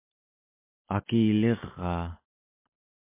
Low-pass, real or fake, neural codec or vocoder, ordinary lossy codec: 3.6 kHz; real; none; MP3, 24 kbps